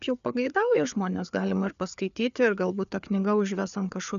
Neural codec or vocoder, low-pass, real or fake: codec, 16 kHz, 4 kbps, FreqCodec, larger model; 7.2 kHz; fake